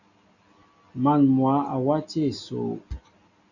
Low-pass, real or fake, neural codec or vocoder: 7.2 kHz; real; none